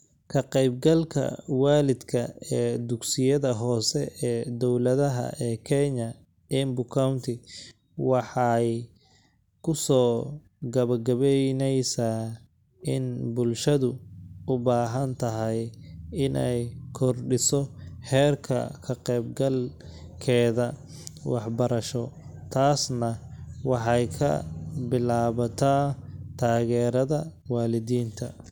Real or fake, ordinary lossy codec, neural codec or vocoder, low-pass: real; none; none; 19.8 kHz